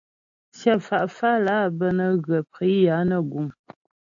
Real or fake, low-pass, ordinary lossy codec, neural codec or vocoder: real; 7.2 kHz; AAC, 48 kbps; none